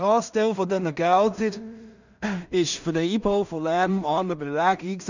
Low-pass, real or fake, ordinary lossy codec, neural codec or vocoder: 7.2 kHz; fake; none; codec, 16 kHz in and 24 kHz out, 0.4 kbps, LongCat-Audio-Codec, two codebook decoder